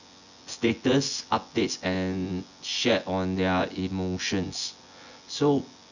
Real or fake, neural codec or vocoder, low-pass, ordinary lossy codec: fake; vocoder, 24 kHz, 100 mel bands, Vocos; 7.2 kHz; none